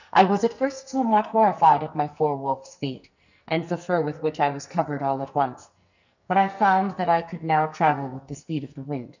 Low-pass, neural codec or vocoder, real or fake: 7.2 kHz; codec, 44.1 kHz, 2.6 kbps, SNAC; fake